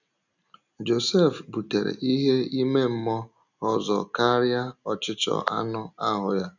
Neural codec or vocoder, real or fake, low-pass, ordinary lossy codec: none; real; 7.2 kHz; none